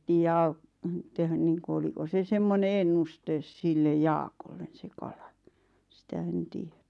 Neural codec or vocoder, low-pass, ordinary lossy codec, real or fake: none; none; none; real